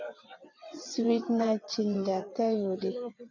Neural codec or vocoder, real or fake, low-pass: vocoder, 22.05 kHz, 80 mel bands, WaveNeXt; fake; 7.2 kHz